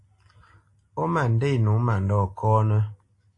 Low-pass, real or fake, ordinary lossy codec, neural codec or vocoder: 10.8 kHz; real; AAC, 48 kbps; none